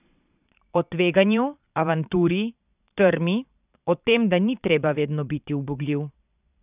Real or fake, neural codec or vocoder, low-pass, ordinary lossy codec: fake; vocoder, 22.05 kHz, 80 mel bands, Vocos; 3.6 kHz; none